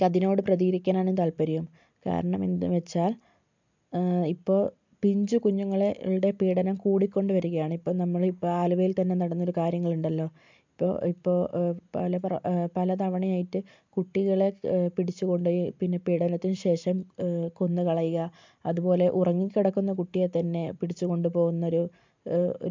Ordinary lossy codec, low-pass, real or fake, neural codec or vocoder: MP3, 64 kbps; 7.2 kHz; real; none